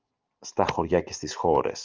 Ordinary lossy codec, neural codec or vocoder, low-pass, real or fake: Opus, 32 kbps; none; 7.2 kHz; real